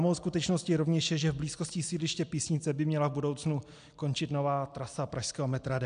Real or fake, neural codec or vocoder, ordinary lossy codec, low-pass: real; none; AAC, 96 kbps; 9.9 kHz